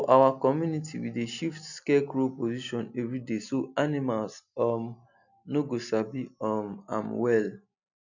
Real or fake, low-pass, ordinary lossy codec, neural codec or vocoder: real; 7.2 kHz; none; none